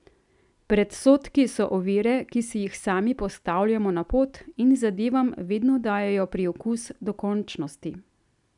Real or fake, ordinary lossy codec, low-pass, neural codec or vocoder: real; none; 10.8 kHz; none